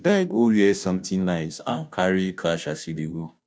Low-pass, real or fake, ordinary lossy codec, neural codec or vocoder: none; fake; none; codec, 16 kHz, 0.5 kbps, FunCodec, trained on Chinese and English, 25 frames a second